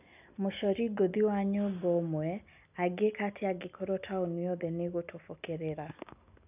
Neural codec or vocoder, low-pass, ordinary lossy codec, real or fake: none; 3.6 kHz; none; real